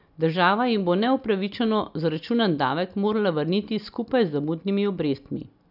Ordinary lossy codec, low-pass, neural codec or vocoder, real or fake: none; 5.4 kHz; none; real